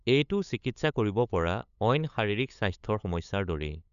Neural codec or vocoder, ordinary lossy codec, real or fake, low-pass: codec, 16 kHz, 8 kbps, FunCodec, trained on LibriTTS, 25 frames a second; none; fake; 7.2 kHz